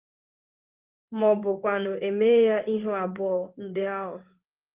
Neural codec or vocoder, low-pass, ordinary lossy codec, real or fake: codec, 16 kHz in and 24 kHz out, 1 kbps, XY-Tokenizer; 3.6 kHz; Opus, 24 kbps; fake